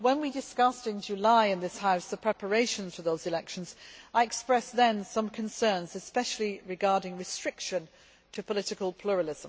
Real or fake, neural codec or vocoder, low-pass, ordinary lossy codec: real; none; none; none